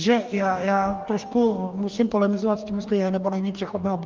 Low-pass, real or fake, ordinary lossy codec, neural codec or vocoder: 7.2 kHz; fake; Opus, 32 kbps; codec, 44.1 kHz, 2.6 kbps, DAC